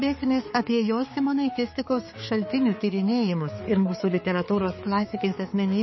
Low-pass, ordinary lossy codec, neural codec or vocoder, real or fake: 7.2 kHz; MP3, 24 kbps; codec, 16 kHz, 4 kbps, X-Codec, HuBERT features, trained on balanced general audio; fake